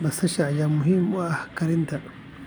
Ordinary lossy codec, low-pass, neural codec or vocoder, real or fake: none; none; none; real